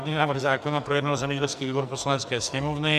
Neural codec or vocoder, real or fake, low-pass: codec, 44.1 kHz, 2.6 kbps, SNAC; fake; 14.4 kHz